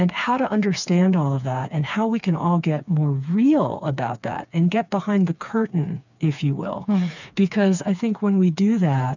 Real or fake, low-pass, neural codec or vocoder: fake; 7.2 kHz; codec, 16 kHz, 4 kbps, FreqCodec, smaller model